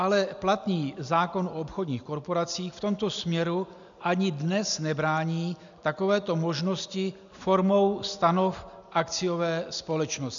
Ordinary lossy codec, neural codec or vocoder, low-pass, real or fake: MP3, 96 kbps; none; 7.2 kHz; real